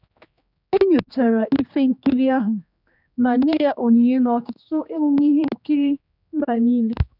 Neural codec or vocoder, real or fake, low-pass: codec, 16 kHz, 2 kbps, X-Codec, HuBERT features, trained on general audio; fake; 5.4 kHz